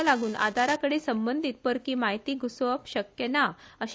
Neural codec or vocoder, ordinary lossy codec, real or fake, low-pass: none; none; real; none